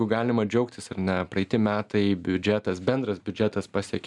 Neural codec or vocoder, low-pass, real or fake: none; 10.8 kHz; real